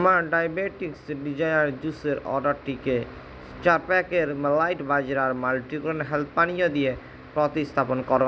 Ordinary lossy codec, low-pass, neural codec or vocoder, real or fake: none; none; none; real